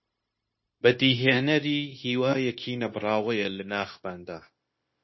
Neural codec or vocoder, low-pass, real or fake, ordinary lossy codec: codec, 16 kHz, 0.9 kbps, LongCat-Audio-Codec; 7.2 kHz; fake; MP3, 24 kbps